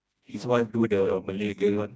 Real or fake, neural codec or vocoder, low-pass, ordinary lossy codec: fake; codec, 16 kHz, 1 kbps, FreqCodec, smaller model; none; none